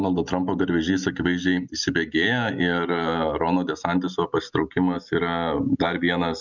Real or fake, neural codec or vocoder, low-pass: real; none; 7.2 kHz